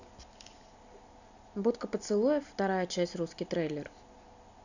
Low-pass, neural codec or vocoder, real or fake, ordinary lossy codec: 7.2 kHz; none; real; none